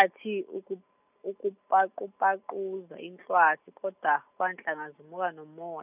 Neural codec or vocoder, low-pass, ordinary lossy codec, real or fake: none; 3.6 kHz; none; real